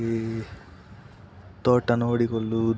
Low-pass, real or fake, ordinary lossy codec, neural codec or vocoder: none; real; none; none